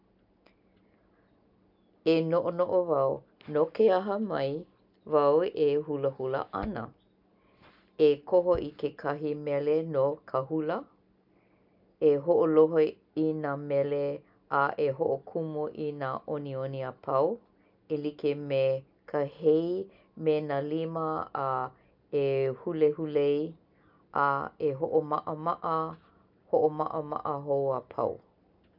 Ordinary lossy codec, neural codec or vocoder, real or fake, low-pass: none; none; real; 5.4 kHz